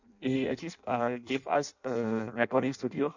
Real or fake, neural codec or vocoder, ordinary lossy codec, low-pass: fake; codec, 16 kHz in and 24 kHz out, 0.6 kbps, FireRedTTS-2 codec; none; 7.2 kHz